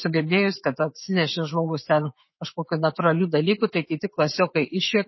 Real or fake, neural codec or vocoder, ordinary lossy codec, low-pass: fake; autoencoder, 48 kHz, 128 numbers a frame, DAC-VAE, trained on Japanese speech; MP3, 24 kbps; 7.2 kHz